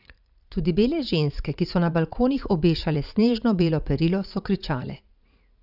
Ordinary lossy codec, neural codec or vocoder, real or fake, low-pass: none; vocoder, 44.1 kHz, 80 mel bands, Vocos; fake; 5.4 kHz